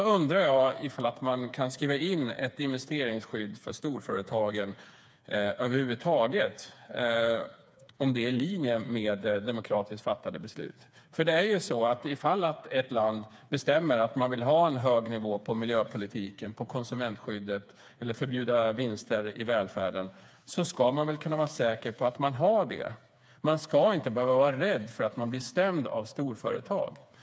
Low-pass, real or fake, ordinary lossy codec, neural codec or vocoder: none; fake; none; codec, 16 kHz, 4 kbps, FreqCodec, smaller model